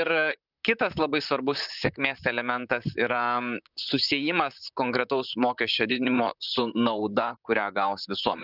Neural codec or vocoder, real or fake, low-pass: vocoder, 44.1 kHz, 128 mel bands, Pupu-Vocoder; fake; 5.4 kHz